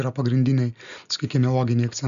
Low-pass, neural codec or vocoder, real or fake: 7.2 kHz; none; real